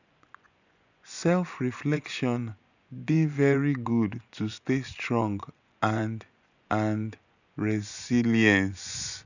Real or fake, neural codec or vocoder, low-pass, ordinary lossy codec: fake; vocoder, 24 kHz, 100 mel bands, Vocos; 7.2 kHz; none